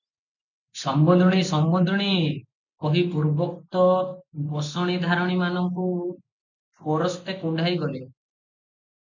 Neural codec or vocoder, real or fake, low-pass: none; real; 7.2 kHz